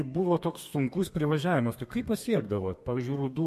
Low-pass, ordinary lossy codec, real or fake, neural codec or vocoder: 14.4 kHz; MP3, 64 kbps; fake; codec, 32 kHz, 1.9 kbps, SNAC